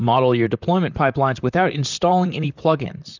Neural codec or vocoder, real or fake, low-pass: vocoder, 44.1 kHz, 128 mel bands, Pupu-Vocoder; fake; 7.2 kHz